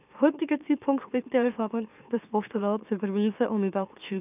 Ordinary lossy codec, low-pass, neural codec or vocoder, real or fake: none; 3.6 kHz; autoencoder, 44.1 kHz, a latent of 192 numbers a frame, MeloTTS; fake